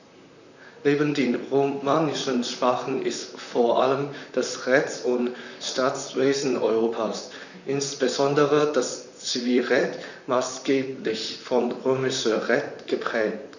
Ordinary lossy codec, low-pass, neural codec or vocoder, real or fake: none; 7.2 kHz; vocoder, 44.1 kHz, 128 mel bands, Pupu-Vocoder; fake